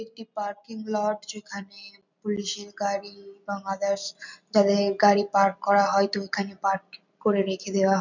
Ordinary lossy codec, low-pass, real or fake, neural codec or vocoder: none; 7.2 kHz; real; none